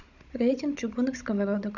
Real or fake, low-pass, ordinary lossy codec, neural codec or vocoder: fake; 7.2 kHz; none; codec, 16 kHz, 16 kbps, FunCodec, trained on Chinese and English, 50 frames a second